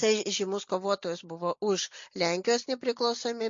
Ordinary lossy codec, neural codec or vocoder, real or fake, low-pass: MP3, 48 kbps; none; real; 7.2 kHz